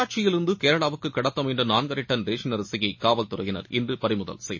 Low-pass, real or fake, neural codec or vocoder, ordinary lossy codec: 7.2 kHz; real; none; MP3, 32 kbps